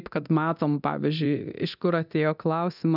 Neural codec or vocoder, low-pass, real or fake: codec, 24 kHz, 0.9 kbps, DualCodec; 5.4 kHz; fake